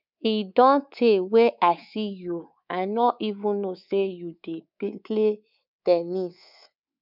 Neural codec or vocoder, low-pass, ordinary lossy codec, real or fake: codec, 24 kHz, 3.1 kbps, DualCodec; 5.4 kHz; none; fake